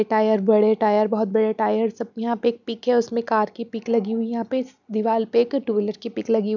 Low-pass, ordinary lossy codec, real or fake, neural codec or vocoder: 7.2 kHz; none; real; none